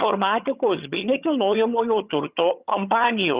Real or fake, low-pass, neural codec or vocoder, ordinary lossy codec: fake; 3.6 kHz; vocoder, 22.05 kHz, 80 mel bands, HiFi-GAN; Opus, 64 kbps